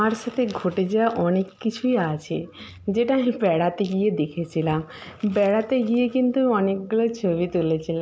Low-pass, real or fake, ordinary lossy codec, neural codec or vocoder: none; real; none; none